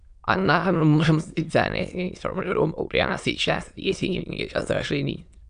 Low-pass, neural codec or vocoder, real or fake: 9.9 kHz; autoencoder, 22.05 kHz, a latent of 192 numbers a frame, VITS, trained on many speakers; fake